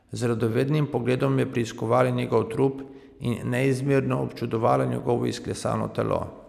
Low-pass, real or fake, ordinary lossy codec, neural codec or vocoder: 14.4 kHz; real; none; none